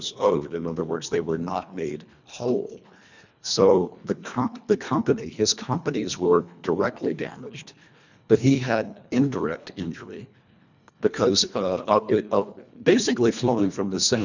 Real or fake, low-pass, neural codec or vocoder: fake; 7.2 kHz; codec, 24 kHz, 1.5 kbps, HILCodec